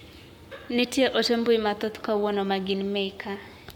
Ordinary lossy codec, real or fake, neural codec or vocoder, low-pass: MP3, 96 kbps; fake; codec, 44.1 kHz, 7.8 kbps, Pupu-Codec; 19.8 kHz